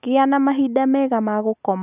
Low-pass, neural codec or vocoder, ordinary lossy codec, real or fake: 3.6 kHz; none; none; real